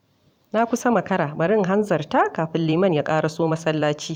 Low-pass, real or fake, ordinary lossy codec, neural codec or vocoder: 19.8 kHz; fake; none; vocoder, 44.1 kHz, 128 mel bands every 512 samples, BigVGAN v2